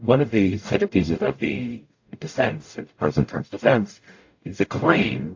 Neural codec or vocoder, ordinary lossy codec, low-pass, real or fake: codec, 44.1 kHz, 0.9 kbps, DAC; AAC, 48 kbps; 7.2 kHz; fake